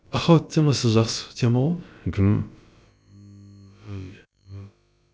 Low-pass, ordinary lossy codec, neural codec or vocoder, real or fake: none; none; codec, 16 kHz, about 1 kbps, DyCAST, with the encoder's durations; fake